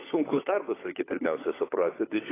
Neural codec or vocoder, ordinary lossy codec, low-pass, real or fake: codec, 16 kHz, 16 kbps, FunCodec, trained on LibriTTS, 50 frames a second; AAC, 16 kbps; 3.6 kHz; fake